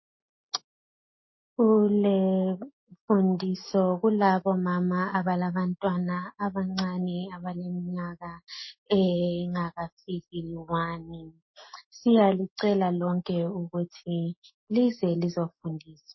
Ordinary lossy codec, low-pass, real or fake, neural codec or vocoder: MP3, 24 kbps; 7.2 kHz; real; none